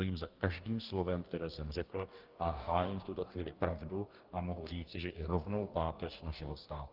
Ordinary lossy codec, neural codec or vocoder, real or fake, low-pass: Opus, 32 kbps; codec, 44.1 kHz, 2.6 kbps, DAC; fake; 5.4 kHz